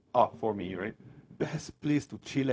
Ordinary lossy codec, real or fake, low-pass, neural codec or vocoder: none; fake; none; codec, 16 kHz, 0.4 kbps, LongCat-Audio-Codec